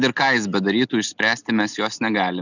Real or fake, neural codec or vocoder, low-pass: real; none; 7.2 kHz